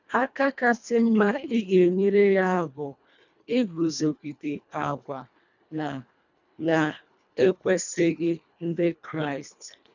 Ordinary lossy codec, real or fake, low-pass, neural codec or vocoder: none; fake; 7.2 kHz; codec, 24 kHz, 1.5 kbps, HILCodec